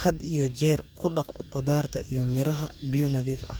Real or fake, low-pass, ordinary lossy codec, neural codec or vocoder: fake; none; none; codec, 44.1 kHz, 2.6 kbps, DAC